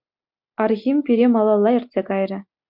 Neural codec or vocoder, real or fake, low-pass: none; real; 5.4 kHz